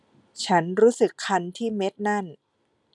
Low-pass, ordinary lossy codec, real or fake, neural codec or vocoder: 10.8 kHz; none; real; none